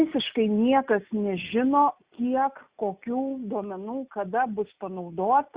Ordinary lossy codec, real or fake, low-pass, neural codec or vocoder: Opus, 64 kbps; real; 3.6 kHz; none